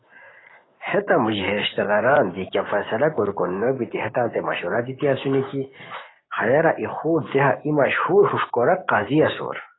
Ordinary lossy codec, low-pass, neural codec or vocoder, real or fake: AAC, 16 kbps; 7.2 kHz; codec, 16 kHz, 6 kbps, DAC; fake